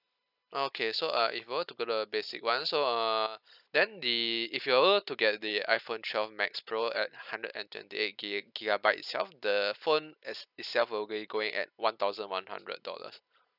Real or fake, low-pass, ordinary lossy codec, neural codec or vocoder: real; 5.4 kHz; none; none